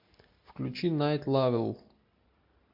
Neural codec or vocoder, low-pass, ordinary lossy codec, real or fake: none; 5.4 kHz; MP3, 48 kbps; real